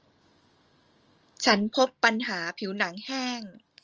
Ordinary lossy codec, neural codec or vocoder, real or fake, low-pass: Opus, 24 kbps; none; real; 7.2 kHz